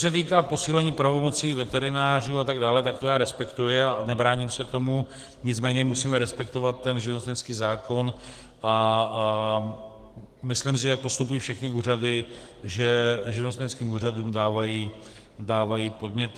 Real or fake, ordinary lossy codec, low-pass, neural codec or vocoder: fake; Opus, 24 kbps; 14.4 kHz; codec, 44.1 kHz, 2.6 kbps, SNAC